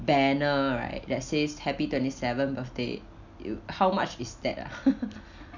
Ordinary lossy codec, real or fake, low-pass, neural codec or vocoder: none; real; 7.2 kHz; none